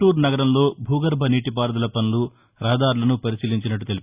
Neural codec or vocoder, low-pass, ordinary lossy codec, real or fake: none; 3.6 kHz; Opus, 64 kbps; real